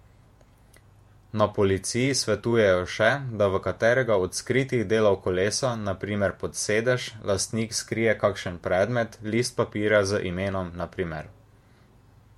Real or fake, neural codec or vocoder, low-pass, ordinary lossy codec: fake; vocoder, 48 kHz, 128 mel bands, Vocos; 19.8 kHz; MP3, 64 kbps